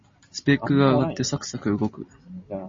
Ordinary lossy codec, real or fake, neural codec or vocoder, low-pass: MP3, 32 kbps; real; none; 7.2 kHz